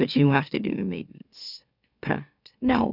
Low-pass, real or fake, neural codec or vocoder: 5.4 kHz; fake; autoencoder, 44.1 kHz, a latent of 192 numbers a frame, MeloTTS